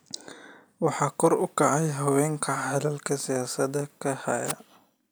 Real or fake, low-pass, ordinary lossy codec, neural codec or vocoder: real; none; none; none